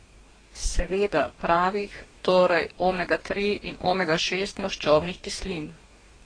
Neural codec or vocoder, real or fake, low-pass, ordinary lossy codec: codec, 44.1 kHz, 2.6 kbps, DAC; fake; 9.9 kHz; AAC, 32 kbps